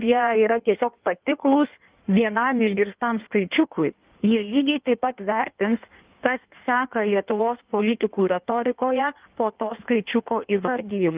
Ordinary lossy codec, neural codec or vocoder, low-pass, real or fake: Opus, 32 kbps; codec, 16 kHz in and 24 kHz out, 1.1 kbps, FireRedTTS-2 codec; 3.6 kHz; fake